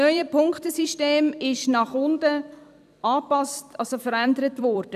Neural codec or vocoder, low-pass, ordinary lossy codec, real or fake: vocoder, 44.1 kHz, 128 mel bands every 256 samples, BigVGAN v2; 14.4 kHz; none; fake